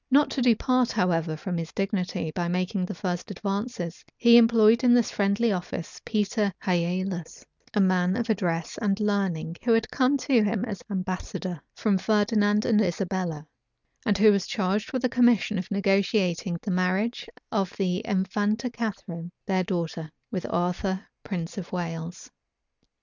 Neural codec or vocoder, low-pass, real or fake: none; 7.2 kHz; real